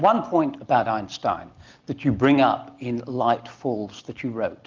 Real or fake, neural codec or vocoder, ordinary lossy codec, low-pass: real; none; Opus, 24 kbps; 7.2 kHz